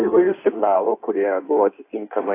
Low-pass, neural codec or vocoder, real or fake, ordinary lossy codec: 3.6 kHz; codec, 16 kHz in and 24 kHz out, 1.1 kbps, FireRedTTS-2 codec; fake; AAC, 24 kbps